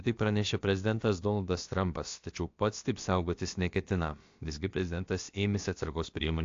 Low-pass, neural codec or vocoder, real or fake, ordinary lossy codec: 7.2 kHz; codec, 16 kHz, about 1 kbps, DyCAST, with the encoder's durations; fake; AAC, 48 kbps